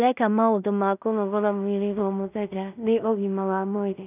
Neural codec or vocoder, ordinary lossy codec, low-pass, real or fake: codec, 16 kHz in and 24 kHz out, 0.4 kbps, LongCat-Audio-Codec, two codebook decoder; none; 3.6 kHz; fake